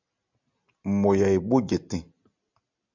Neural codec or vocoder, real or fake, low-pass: none; real; 7.2 kHz